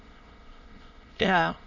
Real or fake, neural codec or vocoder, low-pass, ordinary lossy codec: fake; autoencoder, 22.05 kHz, a latent of 192 numbers a frame, VITS, trained on many speakers; 7.2 kHz; none